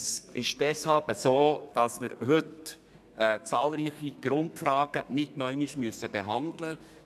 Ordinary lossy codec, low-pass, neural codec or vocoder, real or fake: none; 14.4 kHz; codec, 32 kHz, 1.9 kbps, SNAC; fake